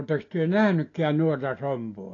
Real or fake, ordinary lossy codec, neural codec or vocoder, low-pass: real; AAC, 32 kbps; none; 7.2 kHz